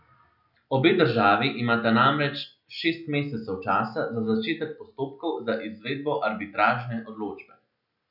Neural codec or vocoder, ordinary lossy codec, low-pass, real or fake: none; none; 5.4 kHz; real